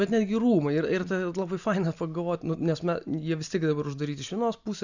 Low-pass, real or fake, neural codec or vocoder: 7.2 kHz; real; none